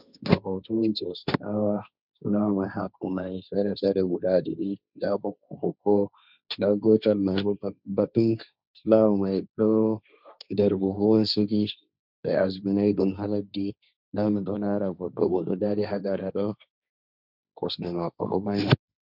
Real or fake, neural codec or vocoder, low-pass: fake; codec, 16 kHz, 1.1 kbps, Voila-Tokenizer; 5.4 kHz